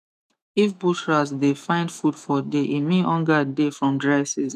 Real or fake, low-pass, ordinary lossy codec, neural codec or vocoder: fake; 14.4 kHz; none; autoencoder, 48 kHz, 128 numbers a frame, DAC-VAE, trained on Japanese speech